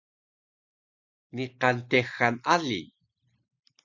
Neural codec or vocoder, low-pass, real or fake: none; 7.2 kHz; real